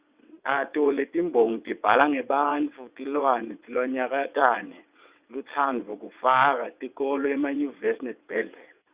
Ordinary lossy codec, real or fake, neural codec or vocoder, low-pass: Opus, 64 kbps; fake; vocoder, 22.05 kHz, 80 mel bands, WaveNeXt; 3.6 kHz